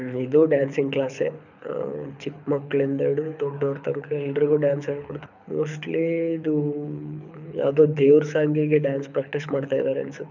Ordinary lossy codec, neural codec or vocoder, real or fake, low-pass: none; codec, 24 kHz, 6 kbps, HILCodec; fake; 7.2 kHz